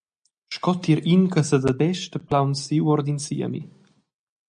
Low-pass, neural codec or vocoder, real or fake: 9.9 kHz; none; real